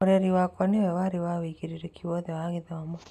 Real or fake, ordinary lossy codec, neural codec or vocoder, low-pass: real; none; none; 14.4 kHz